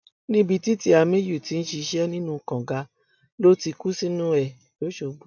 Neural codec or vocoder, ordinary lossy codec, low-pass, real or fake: none; none; 7.2 kHz; real